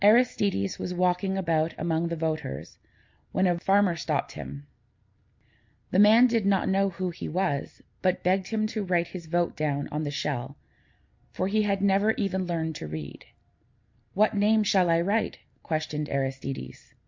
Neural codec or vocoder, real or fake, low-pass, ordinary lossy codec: none; real; 7.2 kHz; MP3, 48 kbps